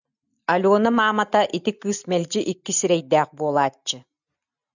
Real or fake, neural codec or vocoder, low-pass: real; none; 7.2 kHz